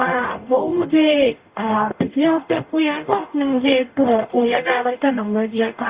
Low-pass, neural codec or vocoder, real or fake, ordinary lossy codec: 3.6 kHz; codec, 44.1 kHz, 0.9 kbps, DAC; fake; Opus, 64 kbps